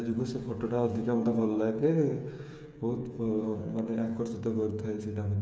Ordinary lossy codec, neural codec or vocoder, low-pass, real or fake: none; codec, 16 kHz, 8 kbps, FreqCodec, smaller model; none; fake